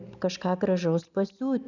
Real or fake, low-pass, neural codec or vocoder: real; 7.2 kHz; none